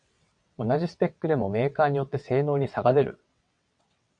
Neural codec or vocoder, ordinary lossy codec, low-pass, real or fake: vocoder, 22.05 kHz, 80 mel bands, WaveNeXt; MP3, 64 kbps; 9.9 kHz; fake